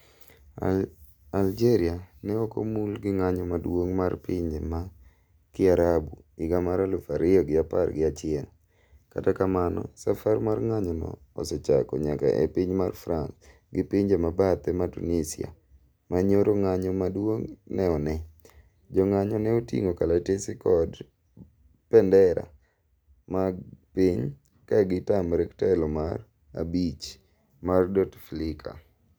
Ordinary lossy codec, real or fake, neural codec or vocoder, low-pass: none; real; none; none